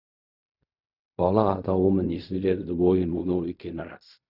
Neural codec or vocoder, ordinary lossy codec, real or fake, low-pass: codec, 16 kHz in and 24 kHz out, 0.4 kbps, LongCat-Audio-Codec, fine tuned four codebook decoder; none; fake; 5.4 kHz